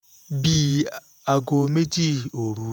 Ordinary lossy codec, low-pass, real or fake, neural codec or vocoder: none; none; fake; vocoder, 48 kHz, 128 mel bands, Vocos